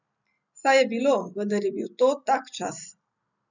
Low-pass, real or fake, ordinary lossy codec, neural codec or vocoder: 7.2 kHz; real; none; none